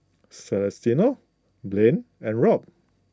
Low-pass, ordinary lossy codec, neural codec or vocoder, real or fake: none; none; none; real